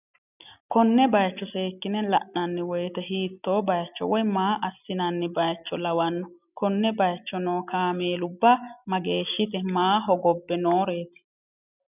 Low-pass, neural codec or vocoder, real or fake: 3.6 kHz; none; real